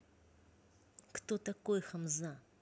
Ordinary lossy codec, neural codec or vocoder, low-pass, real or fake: none; none; none; real